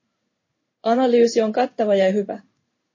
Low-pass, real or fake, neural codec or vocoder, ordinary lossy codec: 7.2 kHz; fake; codec, 16 kHz in and 24 kHz out, 1 kbps, XY-Tokenizer; MP3, 32 kbps